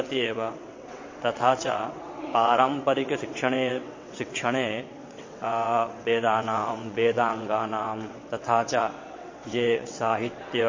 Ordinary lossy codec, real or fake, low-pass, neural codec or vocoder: MP3, 32 kbps; fake; 7.2 kHz; vocoder, 22.05 kHz, 80 mel bands, WaveNeXt